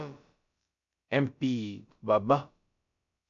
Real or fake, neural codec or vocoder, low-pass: fake; codec, 16 kHz, about 1 kbps, DyCAST, with the encoder's durations; 7.2 kHz